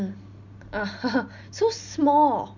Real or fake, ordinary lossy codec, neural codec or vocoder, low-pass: real; none; none; 7.2 kHz